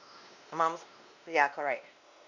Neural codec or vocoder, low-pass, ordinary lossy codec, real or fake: codec, 16 kHz, 1 kbps, X-Codec, WavLM features, trained on Multilingual LibriSpeech; 7.2 kHz; none; fake